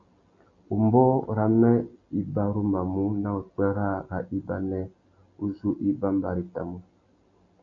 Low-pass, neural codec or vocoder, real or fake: 7.2 kHz; none; real